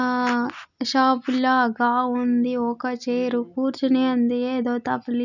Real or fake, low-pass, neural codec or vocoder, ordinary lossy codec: real; 7.2 kHz; none; none